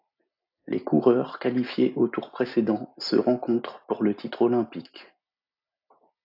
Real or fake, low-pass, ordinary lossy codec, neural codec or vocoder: real; 5.4 kHz; AAC, 48 kbps; none